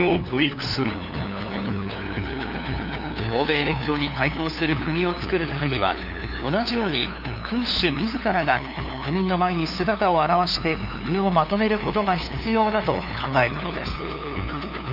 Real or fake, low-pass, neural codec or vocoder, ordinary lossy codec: fake; 5.4 kHz; codec, 16 kHz, 2 kbps, FunCodec, trained on LibriTTS, 25 frames a second; none